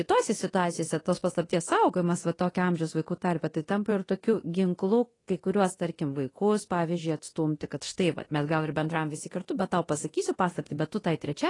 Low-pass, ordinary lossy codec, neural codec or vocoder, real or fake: 10.8 kHz; AAC, 32 kbps; codec, 24 kHz, 0.9 kbps, DualCodec; fake